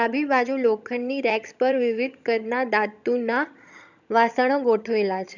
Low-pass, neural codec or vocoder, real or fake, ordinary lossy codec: 7.2 kHz; vocoder, 22.05 kHz, 80 mel bands, HiFi-GAN; fake; none